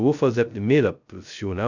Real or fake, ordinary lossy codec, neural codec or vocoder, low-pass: fake; none; codec, 16 kHz, 0.2 kbps, FocalCodec; 7.2 kHz